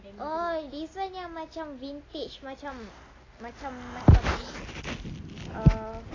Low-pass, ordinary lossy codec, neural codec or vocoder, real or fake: 7.2 kHz; AAC, 32 kbps; none; real